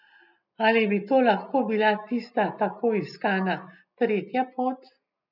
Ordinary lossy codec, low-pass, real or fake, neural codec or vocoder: none; 5.4 kHz; real; none